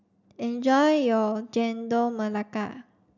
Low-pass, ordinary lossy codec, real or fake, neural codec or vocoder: 7.2 kHz; none; real; none